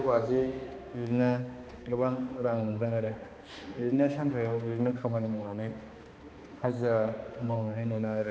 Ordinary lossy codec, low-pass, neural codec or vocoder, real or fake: none; none; codec, 16 kHz, 2 kbps, X-Codec, HuBERT features, trained on balanced general audio; fake